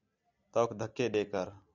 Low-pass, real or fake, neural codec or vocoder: 7.2 kHz; real; none